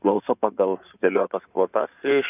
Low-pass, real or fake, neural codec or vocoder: 3.6 kHz; fake; codec, 16 kHz in and 24 kHz out, 2.2 kbps, FireRedTTS-2 codec